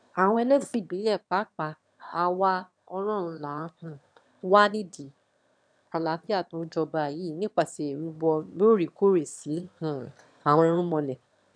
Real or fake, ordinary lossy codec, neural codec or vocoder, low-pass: fake; none; autoencoder, 22.05 kHz, a latent of 192 numbers a frame, VITS, trained on one speaker; 9.9 kHz